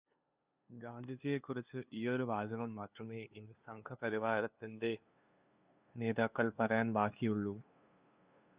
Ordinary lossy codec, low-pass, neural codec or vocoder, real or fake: Opus, 64 kbps; 3.6 kHz; codec, 16 kHz, 2 kbps, FunCodec, trained on LibriTTS, 25 frames a second; fake